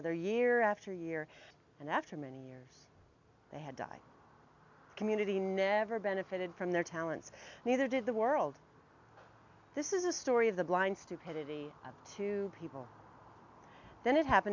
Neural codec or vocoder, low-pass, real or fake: none; 7.2 kHz; real